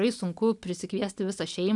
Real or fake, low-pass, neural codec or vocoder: real; 10.8 kHz; none